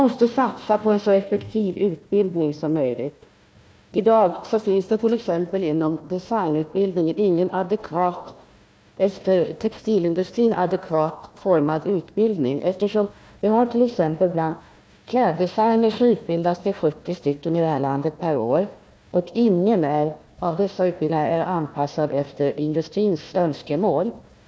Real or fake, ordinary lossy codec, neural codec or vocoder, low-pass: fake; none; codec, 16 kHz, 1 kbps, FunCodec, trained on Chinese and English, 50 frames a second; none